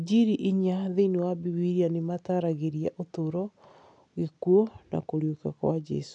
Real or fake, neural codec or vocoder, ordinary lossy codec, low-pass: real; none; none; 9.9 kHz